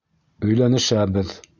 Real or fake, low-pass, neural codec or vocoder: real; 7.2 kHz; none